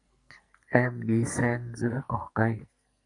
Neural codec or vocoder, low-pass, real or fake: codec, 44.1 kHz, 2.6 kbps, SNAC; 10.8 kHz; fake